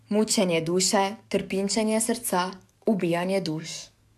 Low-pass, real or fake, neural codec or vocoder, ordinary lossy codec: 14.4 kHz; fake; codec, 44.1 kHz, 7.8 kbps, DAC; AAC, 96 kbps